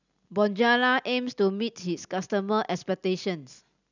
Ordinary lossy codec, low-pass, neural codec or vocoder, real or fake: none; 7.2 kHz; none; real